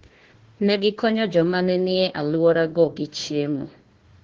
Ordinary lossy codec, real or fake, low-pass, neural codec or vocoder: Opus, 16 kbps; fake; 7.2 kHz; codec, 16 kHz, 1 kbps, FunCodec, trained on Chinese and English, 50 frames a second